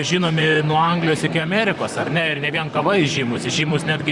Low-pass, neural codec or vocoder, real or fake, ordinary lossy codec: 10.8 kHz; vocoder, 44.1 kHz, 128 mel bands, Pupu-Vocoder; fake; Opus, 64 kbps